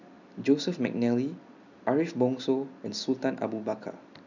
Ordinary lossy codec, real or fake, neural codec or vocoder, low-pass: none; real; none; 7.2 kHz